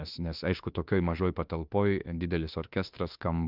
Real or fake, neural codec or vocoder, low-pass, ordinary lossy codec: fake; autoencoder, 48 kHz, 32 numbers a frame, DAC-VAE, trained on Japanese speech; 5.4 kHz; Opus, 32 kbps